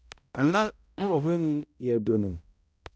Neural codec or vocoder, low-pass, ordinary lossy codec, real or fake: codec, 16 kHz, 0.5 kbps, X-Codec, HuBERT features, trained on balanced general audio; none; none; fake